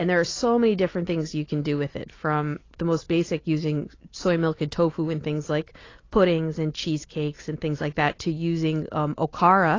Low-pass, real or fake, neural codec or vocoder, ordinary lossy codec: 7.2 kHz; real; none; AAC, 32 kbps